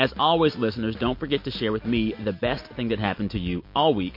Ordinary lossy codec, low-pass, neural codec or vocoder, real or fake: MP3, 32 kbps; 5.4 kHz; none; real